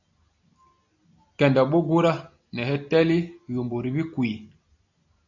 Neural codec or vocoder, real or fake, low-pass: none; real; 7.2 kHz